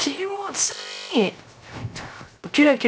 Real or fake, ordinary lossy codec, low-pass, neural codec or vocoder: fake; none; none; codec, 16 kHz, 0.3 kbps, FocalCodec